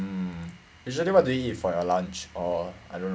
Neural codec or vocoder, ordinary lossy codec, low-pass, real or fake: none; none; none; real